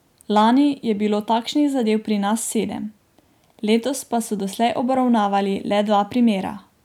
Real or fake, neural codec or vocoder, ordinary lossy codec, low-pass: real; none; none; 19.8 kHz